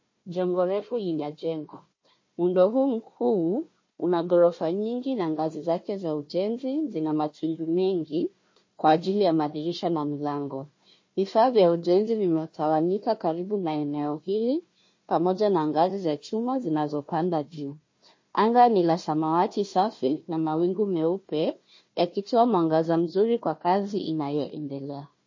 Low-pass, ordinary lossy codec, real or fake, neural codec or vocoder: 7.2 kHz; MP3, 32 kbps; fake; codec, 16 kHz, 1 kbps, FunCodec, trained on Chinese and English, 50 frames a second